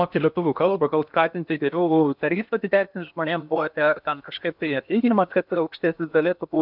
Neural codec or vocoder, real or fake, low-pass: codec, 16 kHz in and 24 kHz out, 0.8 kbps, FocalCodec, streaming, 65536 codes; fake; 5.4 kHz